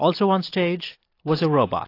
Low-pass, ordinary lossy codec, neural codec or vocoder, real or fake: 5.4 kHz; AAC, 32 kbps; none; real